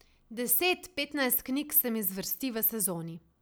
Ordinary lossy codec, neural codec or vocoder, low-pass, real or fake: none; none; none; real